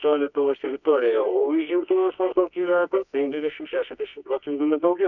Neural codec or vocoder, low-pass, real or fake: codec, 24 kHz, 0.9 kbps, WavTokenizer, medium music audio release; 7.2 kHz; fake